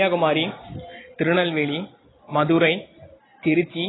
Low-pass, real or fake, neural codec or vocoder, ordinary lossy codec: 7.2 kHz; real; none; AAC, 16 kbps